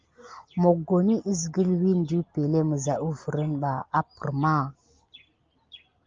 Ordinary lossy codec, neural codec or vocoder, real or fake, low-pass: Opus, 24 kbps; none; real; 7.2 kHz